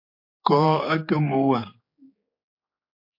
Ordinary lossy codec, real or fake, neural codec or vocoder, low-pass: MP3, 32 kbps; fake; codec, 16 kHz, 4 kbps, X-Codec, HuBERT features, trained on general audio; 5.4 kHz